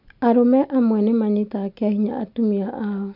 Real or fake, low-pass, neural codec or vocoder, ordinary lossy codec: real; 5.4 kHz; none; none